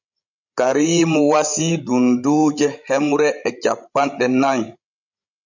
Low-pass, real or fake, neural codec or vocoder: 7.2 kHz; fake; codec, 16 kHz, 16 kbps, FreqCodec, larger model